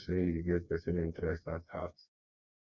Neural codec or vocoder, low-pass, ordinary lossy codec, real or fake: codec, 16 kHz, 2 kbps, FreqCodec, smaller model; 7.2 kHz; none; fake